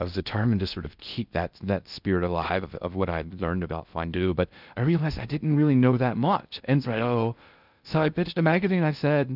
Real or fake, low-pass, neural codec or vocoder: fake; 5.4 kHz; codec, 16 kHz in and 24 kHz out, 0.6 kbps, FocalCodec, streaming, 2048 codes